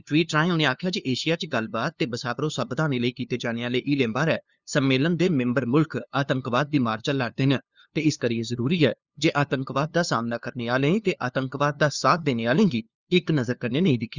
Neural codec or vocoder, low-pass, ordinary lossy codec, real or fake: codec, 16 kHz, 2 kbps, FunCodec, trained on LibriTTS, 25 frames a second; 7.2 kHz; Opus, 64 kbps; fake